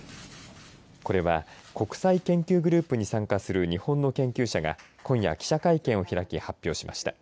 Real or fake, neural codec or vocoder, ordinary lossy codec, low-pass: real; none; none; none